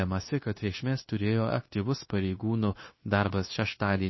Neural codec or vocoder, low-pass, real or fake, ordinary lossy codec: codec, 16 kHz, 0.9 kbps, LongCat-Audio-Codec; 7.2 kHz; fake; MP3, 24 kbps